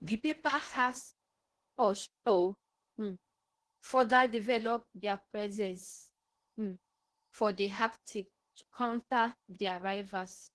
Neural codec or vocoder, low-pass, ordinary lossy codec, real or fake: codec, 16 kHz in and 24 kHz out, 0.8 kbps, FocalCodec, streaming, 65536 codes; 10.8 kHz; Opus, 16 kbps; fake